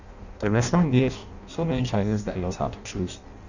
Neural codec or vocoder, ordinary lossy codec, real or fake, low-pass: codec, 16 kHz in and 24 kHz out, 0.6 kbps, FireRedTTS-2 codec; none; fake; 7.2 kHz